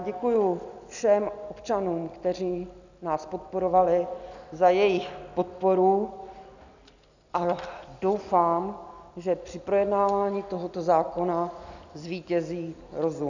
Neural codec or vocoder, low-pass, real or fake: none; 7.2 kHz; real